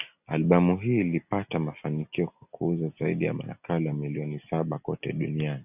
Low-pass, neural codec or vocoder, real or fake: 3.6 kHz; none; real